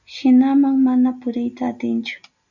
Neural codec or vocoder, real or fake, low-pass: none; real; 7.2 kHz